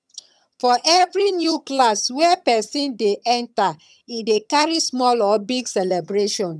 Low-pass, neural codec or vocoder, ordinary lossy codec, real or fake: none; vocoder, 22.05 kHz, 80 mel bands, HiFi-GAN; none; fake